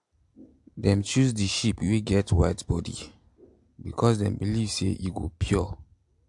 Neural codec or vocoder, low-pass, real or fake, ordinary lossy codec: none; 10.8 kHz; real; MP3, 64 kbps